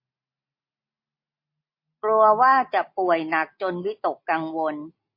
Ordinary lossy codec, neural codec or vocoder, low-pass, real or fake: MP3, 32 kbps; none; 5.4 kHz; real